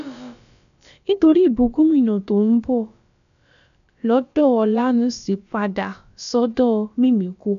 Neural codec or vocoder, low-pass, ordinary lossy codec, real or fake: codec, 16 kHz, about 1 kbps, DyCAST, with the encoder's durations; 7.2 kHz; none; fake